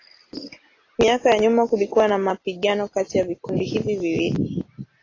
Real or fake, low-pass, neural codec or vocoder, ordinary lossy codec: real; 7.2 kHz; none; AAC, 32 kbps